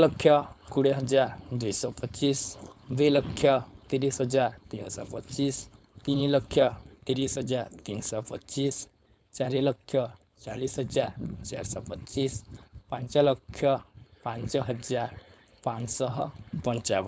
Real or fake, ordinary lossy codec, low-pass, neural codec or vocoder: fake; none; none; codec, 16 kHz, 4.8 kbps, FACodec